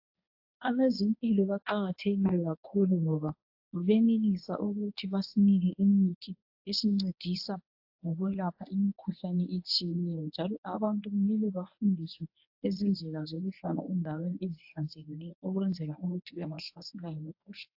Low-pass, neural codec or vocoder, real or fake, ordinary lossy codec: 5.4 kHz; codec, 24 kHz, 0.9 kbps, WavTokenizer, medium speech release version 1; fake; AAC, 48 kbps